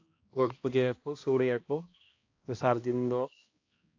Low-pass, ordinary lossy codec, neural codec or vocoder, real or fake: 7.2 kHz; AAC, 48 kbps; codec, 16 kHz, 1 kbps, X-Codec, HuBERT features, trained on balanced general audio; fake